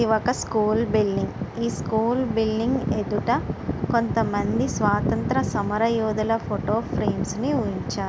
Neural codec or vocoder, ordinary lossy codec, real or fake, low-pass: none; none; real; none